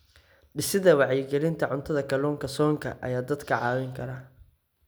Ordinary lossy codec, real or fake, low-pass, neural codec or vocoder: none; real; none; none